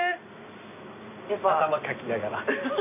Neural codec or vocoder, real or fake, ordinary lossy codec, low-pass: none; real; none; 3.6 kHz